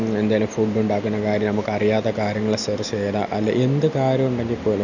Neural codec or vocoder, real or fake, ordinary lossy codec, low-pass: none; real; none; 7.2 kHz